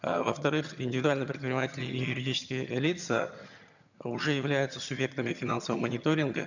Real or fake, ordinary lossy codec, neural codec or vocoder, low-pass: fake; none; vocoder, 22.05 kHz, 80 mel bands, HiFi-GAN; 7.2 kHz